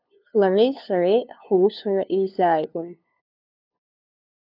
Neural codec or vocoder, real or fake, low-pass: codec, 16 kHz, 2 kbps, FunCodec, trained on LibriTTS, 25 frames a second; fake; 5.4 kHz